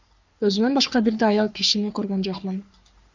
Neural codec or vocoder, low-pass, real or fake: codec, 44.1 kHz, 3.4 kbps, Pupu-Codec; 7.2 kHz; fake